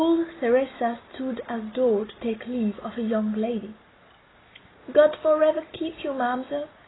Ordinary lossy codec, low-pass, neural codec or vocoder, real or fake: AAC, 16 kbps; 7.2 kHz; none; real